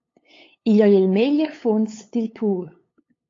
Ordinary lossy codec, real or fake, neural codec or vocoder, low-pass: AAC, 32 kbps; fake; codec, 16 kHz, 8 kbps, FunCodec, trained on LibriTTS, 25 frames a second; 7.2 kHz